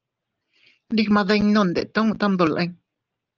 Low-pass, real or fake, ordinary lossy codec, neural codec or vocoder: 7.2 kHz; real; Opus, 32 kbps; none